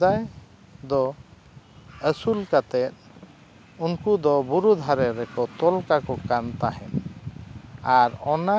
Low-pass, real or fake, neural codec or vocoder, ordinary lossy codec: none; real; none; none